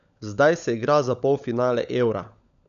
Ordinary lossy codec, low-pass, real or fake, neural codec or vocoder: none; 7.2 kHz; fake; codec, 16 kHz, 16 kbps, FunCodec, trained on LibriTTS, 50 frames a second